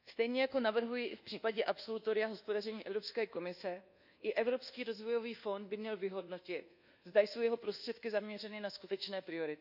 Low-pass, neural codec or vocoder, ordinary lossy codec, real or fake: 5.4 kHz; codec, 24 kHz, 1.2 kbps, DualCodec; none; fake